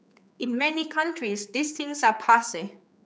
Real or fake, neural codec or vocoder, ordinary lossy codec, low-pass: fake; codec, 16 kHz, 4 kbps, X-Codec, HuBERT features, trained on general audio; none; none